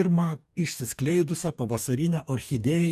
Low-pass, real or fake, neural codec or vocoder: 14.4 kHz; fake; codec, 44.1 kHz, 2.6 kbps, DAC